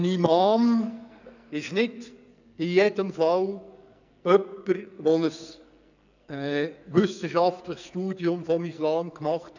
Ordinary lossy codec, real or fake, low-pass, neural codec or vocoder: none; fake; 7.2 kHz; codec, 44.1 kHz, 2.6 kbps, SNAC